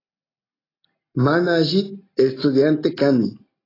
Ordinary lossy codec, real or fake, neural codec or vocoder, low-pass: AAC, 24 kbps; real; none; 5.4 kHz